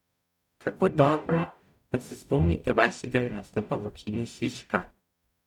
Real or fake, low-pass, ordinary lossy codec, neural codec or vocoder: fake; 19.8 kHz; none; codec, 44.1 kHz, 0.9 kbps, DAC